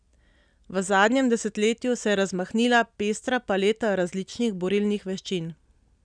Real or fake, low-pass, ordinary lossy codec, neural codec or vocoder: real; 9.9 kHz; none; none